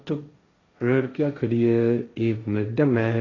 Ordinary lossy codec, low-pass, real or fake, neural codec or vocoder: AAC, 32 kbps; 7.2 kHz; fake; codec, 16 kHz, 1.1 kbps, Voila-Tokenizer